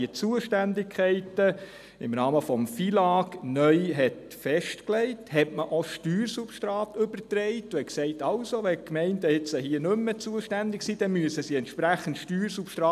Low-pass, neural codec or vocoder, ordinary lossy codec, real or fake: 14.4 kHz; none; none; real